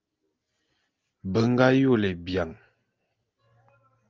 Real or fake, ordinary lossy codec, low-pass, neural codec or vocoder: real; Opus, 24 kbps; 7.2 kHz; none